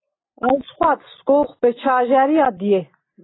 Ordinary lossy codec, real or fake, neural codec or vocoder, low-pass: AAC, 16 kbps; real; none; 7.2 kHz